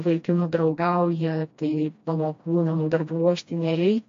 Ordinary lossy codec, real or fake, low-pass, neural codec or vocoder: MP3, 48 kbps; fake; 7.2 kHz; codec, 16 kHz, 1 kbps, FreqCodec, smaller model